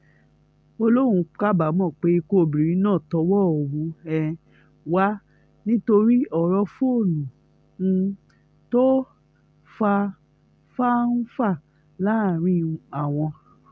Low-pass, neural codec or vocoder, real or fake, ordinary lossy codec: none; none; real; none